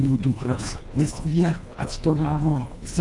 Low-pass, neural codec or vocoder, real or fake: 10.8 kHz; codec, 24 kHz, 1.5 kbps, HILCodec; fake